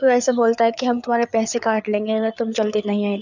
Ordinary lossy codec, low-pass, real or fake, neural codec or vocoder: none; 7.2 kHz; fake; codec, 16 kHz, 4 kbps, FreqCodec, larger model